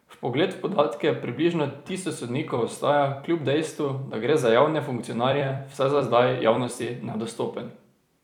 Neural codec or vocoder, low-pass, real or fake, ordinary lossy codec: vocoder, 44.1 kHz, 128 mel bands every 256 samples, BigVGAN v2; 19.8 kHz; fake; none